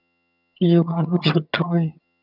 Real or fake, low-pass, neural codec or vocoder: fake; 5.4 kHz; vocoder, 22.05 kHz, 80 mel bands, HiFi-GAN